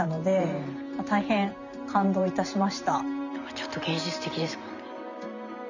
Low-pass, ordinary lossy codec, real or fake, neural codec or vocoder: 7.2 kHz; none; fake; vocoder, 44.1 kHz, 128 mel bands every 512 samples, BigVGAN v2